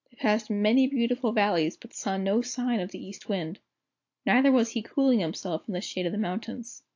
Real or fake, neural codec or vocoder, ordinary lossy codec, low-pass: real; none; AAC, 48 kbps; 7.2 kHz